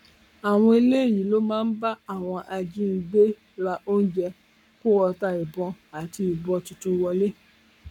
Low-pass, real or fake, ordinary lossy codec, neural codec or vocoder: 19.8 kHz; fake; none; codec, 44.1 kHz, 7.8 kbps, Pupu-Codec